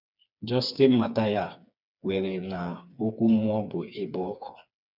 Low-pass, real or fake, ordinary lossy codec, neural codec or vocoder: 5.4 kHz; fake; none; codec, 16 kHz, 4 kbps, FreqCodec, smaller model